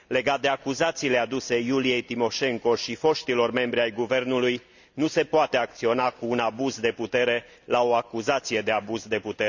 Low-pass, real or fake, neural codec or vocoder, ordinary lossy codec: 7.2 kHz; real; none; none